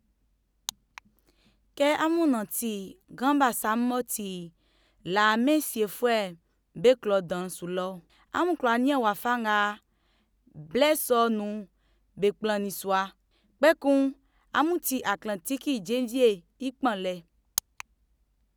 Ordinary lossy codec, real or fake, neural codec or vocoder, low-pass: none; real; none; none